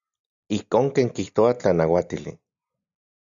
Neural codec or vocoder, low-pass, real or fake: none; 7.2 kHz; real